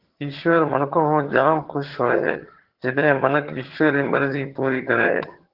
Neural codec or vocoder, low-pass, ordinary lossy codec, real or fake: vocoder, 22.05 kHz, 80 mel bands, HiFi-GAN; 5.4 kHz; Opus, 16 kbps; fake